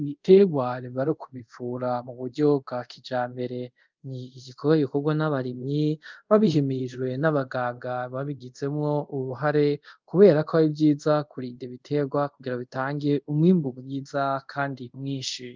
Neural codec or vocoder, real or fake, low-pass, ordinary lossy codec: codec, 24 kHz, 0.5 kbps, DualCodec; fake; 7.2 kHz; Opus, 24 kbps